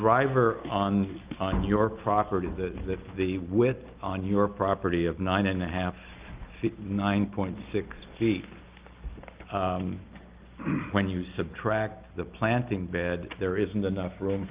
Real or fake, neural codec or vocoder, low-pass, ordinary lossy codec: real; none; 3.6 kHz; Opus, 32 kbps